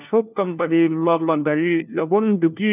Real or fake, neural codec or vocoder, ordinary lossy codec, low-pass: fake; codec, 16 kHz, 1 kbps, FunCodec, trained on LibriTTS, 50 frames a second; none; 3.6 kHz